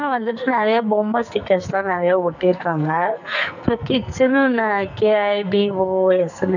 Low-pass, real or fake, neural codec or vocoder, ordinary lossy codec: 7.2 kHz; fake; codec, 44.1 kHz, 2.6 kbps, SNAC; none